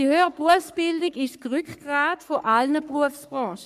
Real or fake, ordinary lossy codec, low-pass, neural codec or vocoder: fake; MP3, 96 kbps; 14.4 kHz; codec, 44.1 kHz, 3.4 kbps, Pupu-Codec